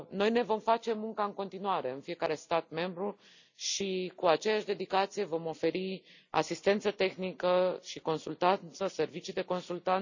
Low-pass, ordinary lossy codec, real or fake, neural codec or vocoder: 7.2 kHz; none; real; none